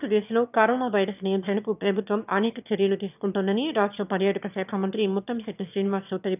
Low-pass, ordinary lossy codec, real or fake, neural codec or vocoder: 3.6 kHz; none; fake; autoencoder, 22.05 kHz, a latent of 192 numbers a frame, VITS, trained on one speaker